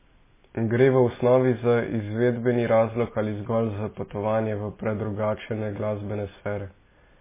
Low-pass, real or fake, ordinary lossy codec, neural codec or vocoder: 3.6 kHz; real; MP3, 16 kbps; none